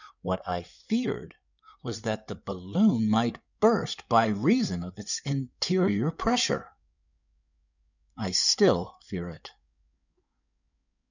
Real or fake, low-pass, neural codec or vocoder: fake; 7.2 kHz; codec, 16 kHz in and 24 kHz out, 2.2 kbps, FireRedTTS-2 codec